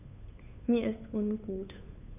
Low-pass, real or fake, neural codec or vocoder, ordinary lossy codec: 3.6 kHz; fake; codec, 16 kHz, 8 kbps, FunCodec, trained on Chinese and English, 25 frames a second; none